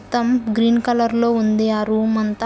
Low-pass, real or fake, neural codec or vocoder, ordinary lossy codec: none; real; none; none